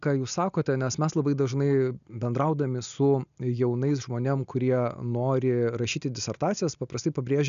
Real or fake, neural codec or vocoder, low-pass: real; none; 7.2 kHz